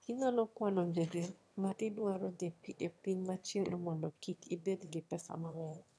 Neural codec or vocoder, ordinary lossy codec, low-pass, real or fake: autoencoder, 22.05 kHz, a latent of 192 numbers a frame, VITS, trained on one speaker; none; none; fake